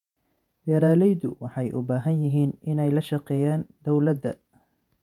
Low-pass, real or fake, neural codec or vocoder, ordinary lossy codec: 19.8 kHz; fake; vocoder, 48 kHz, 128 mel bands, Vocos; none